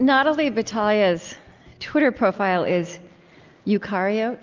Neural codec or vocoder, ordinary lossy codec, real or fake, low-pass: none; Opus, 32 kbps; real; 7.2 kHz